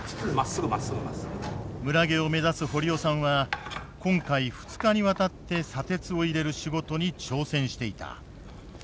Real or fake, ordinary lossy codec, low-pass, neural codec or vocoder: real; none; none; none